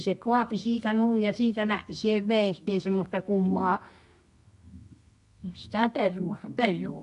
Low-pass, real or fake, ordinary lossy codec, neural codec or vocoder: 10.8 kHz; fake; AAC, 64 kbps; codec, 24 kHz, 0.9 kbps, WavTokenizer, medium music audio release